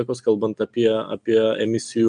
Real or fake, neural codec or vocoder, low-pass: real; none; 9.9 kHz